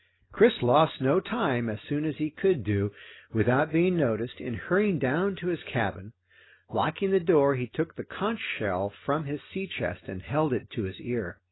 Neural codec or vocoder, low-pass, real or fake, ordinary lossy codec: none; 7.2 kHz; real; AAC, 16 kbps